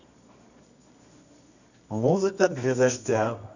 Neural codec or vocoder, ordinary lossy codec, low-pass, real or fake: codec, 24 kHz, 0.9 kbps, WavTokenizer, medium music audio release; none; 7.2 kHz; fake